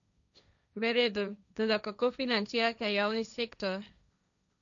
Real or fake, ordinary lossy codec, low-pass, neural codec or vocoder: fake; MP3, 64 kbps; 7.2 kHz; codec, 16 kHz, 1.1 kbps, Voila-Tokenizer